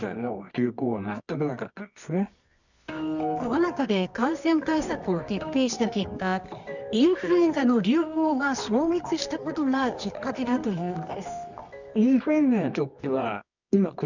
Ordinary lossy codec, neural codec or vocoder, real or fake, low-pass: none; codec, 24 kHz, 0.9 kbps, WavTokenizer, medium music audio release; fake; 7.2 kHz